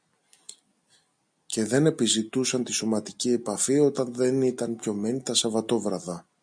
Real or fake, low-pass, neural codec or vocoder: real; 9.9 kHz; none